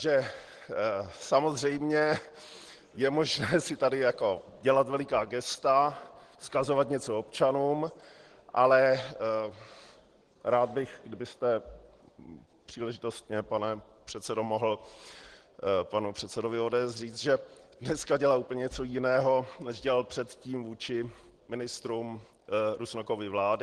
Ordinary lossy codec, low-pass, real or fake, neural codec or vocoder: Opus, 16 kbps; 9.9 kHz; real; none